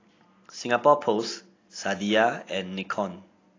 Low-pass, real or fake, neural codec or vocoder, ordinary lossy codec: 7.2 kHz; real; none; AAC, 32 kbps